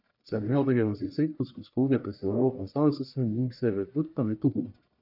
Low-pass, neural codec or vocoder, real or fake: 5.4 kHz; codec, 44.1 kHz, 1.7 kbps, Pupu-Codec; fake